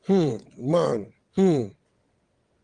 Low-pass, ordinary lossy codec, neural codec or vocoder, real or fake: 9.9 kHz; Opus, 24 kbps; vocoder, 22.05 kHz, 80 mel bands, WaveNeXt; fake